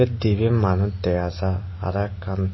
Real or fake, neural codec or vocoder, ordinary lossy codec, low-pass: real; none; MP3, 24 kbps; 7.2 kHz